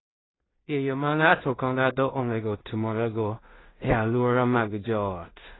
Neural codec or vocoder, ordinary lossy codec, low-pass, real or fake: codec, 16 kHz in and 24 kHz out, 0.4 kbps, LongCat-Audio-Codec, two codebook decoder; AAC, 16 kbps; 7.2 kHz; fake